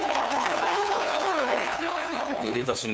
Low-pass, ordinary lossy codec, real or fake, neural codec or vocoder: none; none; fake; codec, 16 kHz, 2 kbps, FunCodec, trained on LibriTTS, 25 frames a second